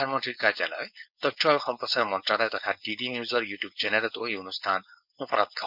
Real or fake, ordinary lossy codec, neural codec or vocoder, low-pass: fake; none; codec, 16 kHz, 4.8 kbps, FACodec; 5.4 kHz